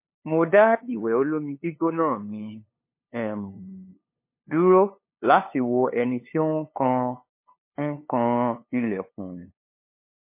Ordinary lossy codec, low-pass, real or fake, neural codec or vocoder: MP3, 24 kbps; 3.6 kHz; fake; codec, 16 kHz, 2 kbps, FunCodec, trained on LibriTTS, 25 frames a second